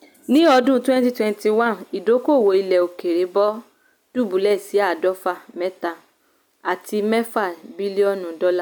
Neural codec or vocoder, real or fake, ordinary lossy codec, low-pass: none; real; none; none